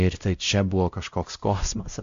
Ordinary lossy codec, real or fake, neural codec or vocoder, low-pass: MP3, 64 kbps; fake; codec, 16 kHz, 0.5 kbps, X-Codec, WavLM features, trained on Multilingual LibriSpeech; 7.2 kHz